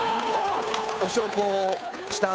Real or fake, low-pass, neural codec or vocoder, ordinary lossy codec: fake; none; codec, 16 kHz, 2 kbps, FunCodec, trained on Chinese and English, 25 frames a second; none